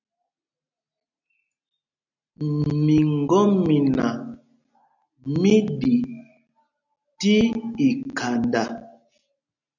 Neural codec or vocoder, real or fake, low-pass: none; real; 7.2 kHz